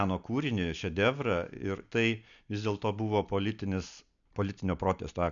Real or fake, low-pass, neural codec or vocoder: real; 7.2 kHz; none